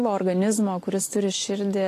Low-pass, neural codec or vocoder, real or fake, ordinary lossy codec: 14.4 kHz; none; real; AAC, 48 kbps